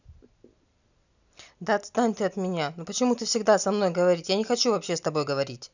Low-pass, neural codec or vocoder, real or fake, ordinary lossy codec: 7.2 kHz; none; real; none